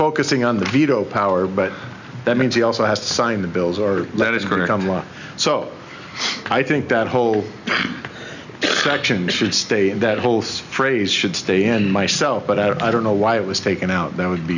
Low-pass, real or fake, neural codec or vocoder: 7.2 kHz; real; none